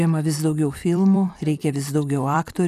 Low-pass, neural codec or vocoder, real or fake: 14.4 kHz; vocoder, 44.1 kHz, 128 mel bands every 256 samples, BigVGAN v2; fake